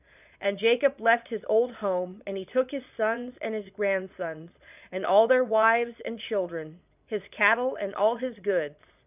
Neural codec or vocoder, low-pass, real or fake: vocoder, 22.05 kHz, 80 mel bands, Vocos; 3.6 kHz; fake